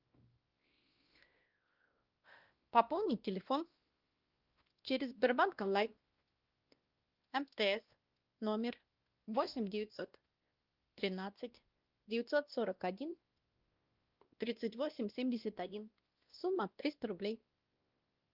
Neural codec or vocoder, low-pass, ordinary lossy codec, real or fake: codec, 16 kHz, 1 kbps, X-Codec, WavLM features, trained on Multilingual LibriSpeech; 5.4 kHz; Opus, 32 kbps; fake